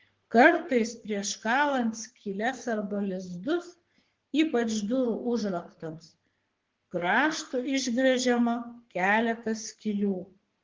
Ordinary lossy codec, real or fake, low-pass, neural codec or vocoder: Opus, 16 kbps; fake; 7.2 kHz; codec, 44.1 kHz, 3.4 kbps, Pupu-Codec